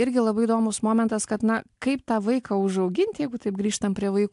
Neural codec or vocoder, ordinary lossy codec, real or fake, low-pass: none; AAC, 64 kbps; real; 10.8 kHz